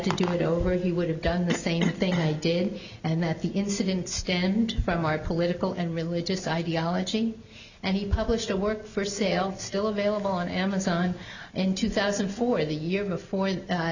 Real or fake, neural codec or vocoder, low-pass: real; none; 7.2 kHz